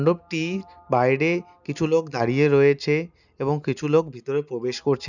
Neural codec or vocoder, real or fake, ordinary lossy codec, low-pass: none; real; none; 7.2 kHz